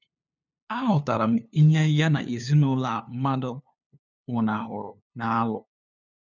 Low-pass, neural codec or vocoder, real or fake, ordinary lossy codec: 7.2 kHz; codec, 16 kHz, 2 kbps, FunCodec, trained on LibriTTS, 25 frames a second; fake; none